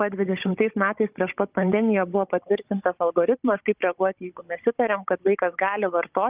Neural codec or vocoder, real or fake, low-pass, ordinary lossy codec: codec, 16 kHz, 16 kbps, FunCodec, trained on Chinese and English, 50 frames a second; fake; 3.6 kHz; Opus, 32 kbps